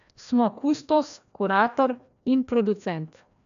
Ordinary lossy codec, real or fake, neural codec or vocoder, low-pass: AAC, 96 kbps; fake; codec, 16 kHz, 1 kbps, FreqCodec, larger model; 7.2 kHz